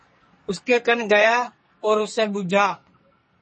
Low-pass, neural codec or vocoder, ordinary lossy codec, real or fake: 10.8 kHz; codec, 44.1 kHz, 2.6 kbps, SNAC; MP3, 32 kbps; fake